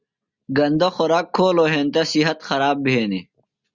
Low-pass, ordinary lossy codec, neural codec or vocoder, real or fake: 7.2 kHz; Opus, 64 kbps; none; real